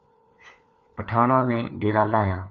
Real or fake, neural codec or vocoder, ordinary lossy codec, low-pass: fake; codec, 16 kHz, 4 kbps, FunCodec, trained on LibriTTS, 50 frames a second; AAC, 64 kbps; 7.2 kHz